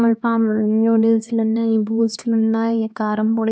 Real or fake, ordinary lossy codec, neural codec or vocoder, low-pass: fake; none; codec, 16 kHz, 2 kbps, X-Codec, HuBERT features, trained on LibriSpeech; none